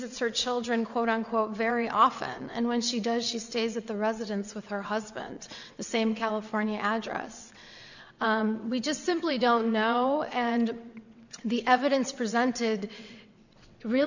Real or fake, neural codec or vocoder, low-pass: fake; vocoder, 22.05 kHz, 80 mel bands, WaveNeXt; 7.2 kHz